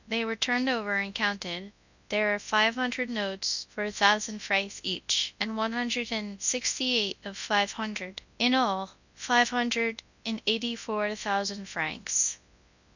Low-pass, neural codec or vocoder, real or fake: 7.2 kHz; codec, 24 kHz, 0.9 kbps, WavTokenizer, large speech release; fake